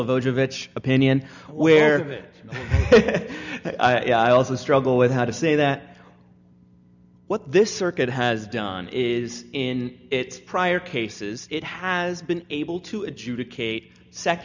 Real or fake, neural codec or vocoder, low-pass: real; none; 7.2 kHz